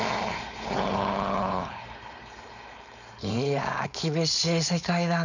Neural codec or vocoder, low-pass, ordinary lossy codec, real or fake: codec, 16 kHz, 4.8 kbps, FACodec; 7.2 kHz; none; fake